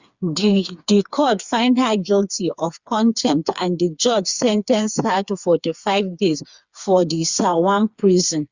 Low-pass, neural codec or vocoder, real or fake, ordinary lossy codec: 7.2 kHz; codec, 16 kHz, 4 kbps, FreqCodec, smaller model; fake; Opus, 64 kbps